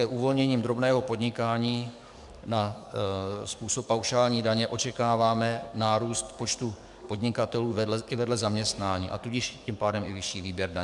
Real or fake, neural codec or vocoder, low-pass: fake; codec, 44.1 kHz, 7.8 kbps, DAC; 10.8 kHz